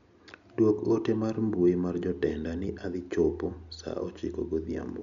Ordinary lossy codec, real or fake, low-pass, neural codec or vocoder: none; real; 7.2 kHz; none